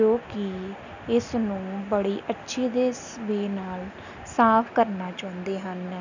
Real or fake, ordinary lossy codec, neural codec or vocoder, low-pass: real; none; none; 7.2 kHz